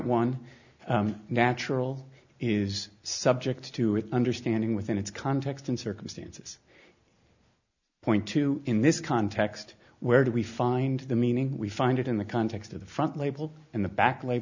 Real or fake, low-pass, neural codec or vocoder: real; 7.2 kHz; none